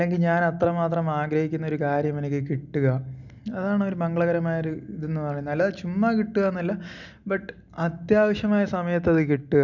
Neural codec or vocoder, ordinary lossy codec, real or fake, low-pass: none; none; real; 7.2 kHz